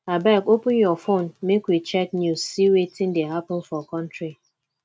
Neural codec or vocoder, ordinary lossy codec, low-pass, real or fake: none; none; none; real